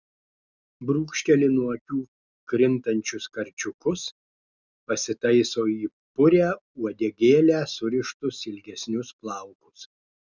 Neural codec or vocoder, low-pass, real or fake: none; 7.2 kHz; real